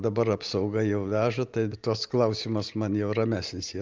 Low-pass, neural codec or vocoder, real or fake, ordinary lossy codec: 7.2 kHz; none; real; Opus, 24 kbps